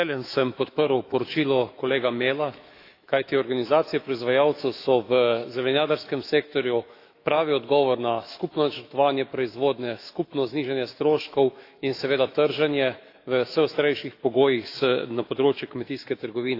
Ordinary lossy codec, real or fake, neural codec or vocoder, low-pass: AAC, 32 kbps; fake; autoencoder, 48 kHz, 128 numbers a frame, DAC-VAE, trained on Japanese speech; 5.4 kHz